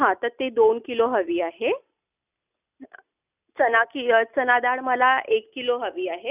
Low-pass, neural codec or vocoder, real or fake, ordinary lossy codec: 3.6 kHz; none; real; none